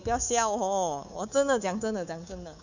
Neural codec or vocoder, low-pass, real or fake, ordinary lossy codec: codec, 24 kHz, 3.1 kbps, DualCodec; 7.2 kHz; fake; none